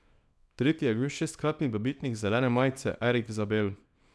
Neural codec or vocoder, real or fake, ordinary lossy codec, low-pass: codec, 24 kHz, 0.9 kbps, WavTokenizer, small release; fake; none; none